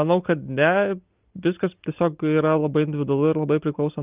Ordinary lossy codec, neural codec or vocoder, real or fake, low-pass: Opus, 32 kbps; none; real; 3.6 kHz